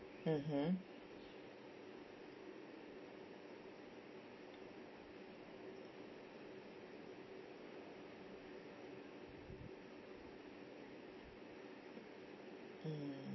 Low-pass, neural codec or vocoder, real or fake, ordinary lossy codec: 7.2 kHz; none; real; MP3, 24 kbps